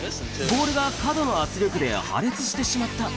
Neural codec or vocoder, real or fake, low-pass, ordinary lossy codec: none; real; none; none